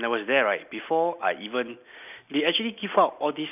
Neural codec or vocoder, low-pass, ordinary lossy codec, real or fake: none; 3.6 kHz; none; real